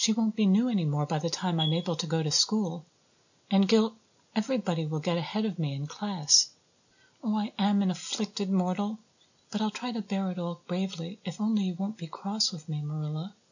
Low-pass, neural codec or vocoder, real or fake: 7.2 kHz; none; real